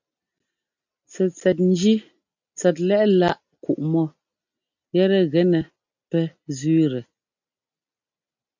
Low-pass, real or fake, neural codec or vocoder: 7.2 kHz; real; none